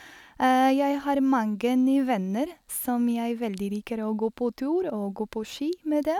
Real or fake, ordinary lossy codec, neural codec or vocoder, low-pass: real; none; none; 19.8 kHz